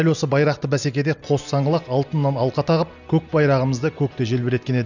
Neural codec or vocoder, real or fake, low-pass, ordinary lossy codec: none; real; 7.2 kHz; none